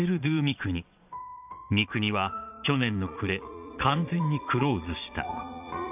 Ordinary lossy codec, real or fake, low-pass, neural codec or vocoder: AAC, 32 kbps; fake; 3.6 kHz; vocoder, 22.05 kHz, 80 mel bands, Vocos